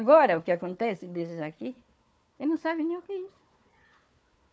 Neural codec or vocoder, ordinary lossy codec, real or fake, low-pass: codec, 16 kHz, 4 kbps, FunCodec, trained on Chinese and English, 50 frames a second; none; fake; none